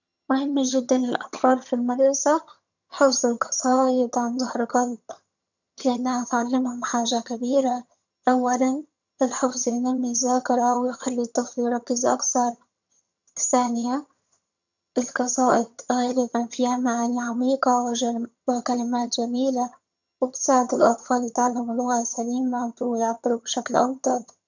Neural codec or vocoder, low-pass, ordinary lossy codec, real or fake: vocoder, 22.05 kHz, 80 mel bands, HiFi-GAN; 7.2 kHz; none; fake